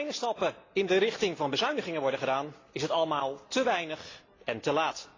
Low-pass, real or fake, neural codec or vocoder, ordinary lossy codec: 7.2 kHz; real; none; AAC, 32 kbps